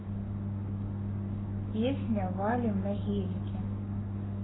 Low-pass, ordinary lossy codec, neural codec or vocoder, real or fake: 7.2 kHz; AAC, 16 kbps; codec, 44.1 kHz, 7.8 kbps, Pupu-Codec; fake